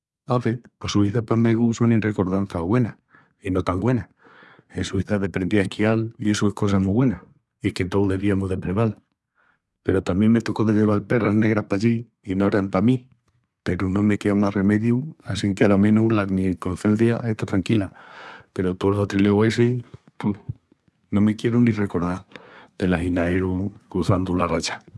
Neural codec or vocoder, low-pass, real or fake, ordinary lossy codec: codec, 24 kHz, 1 kbps, SNAC; none; fake; none